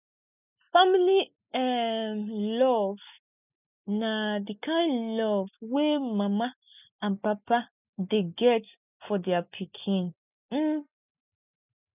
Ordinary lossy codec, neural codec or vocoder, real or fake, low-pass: none; none; real; 3.6 kHz